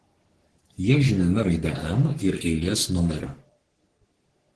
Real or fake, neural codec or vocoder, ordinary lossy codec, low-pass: fake; codec, 44.1 kHz, 3.4 kbps, Pupu-Codec; Opus, 16 kbps; 10.8 kHz